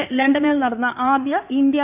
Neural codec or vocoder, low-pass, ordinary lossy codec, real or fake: codec, 16 kHz in and 24 kHz out, 2.2 kbps, FireRedTTS-2 codec; 3.6 kHz; none; fake